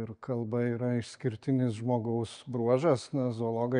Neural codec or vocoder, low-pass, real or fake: none; 9.9 kHz; real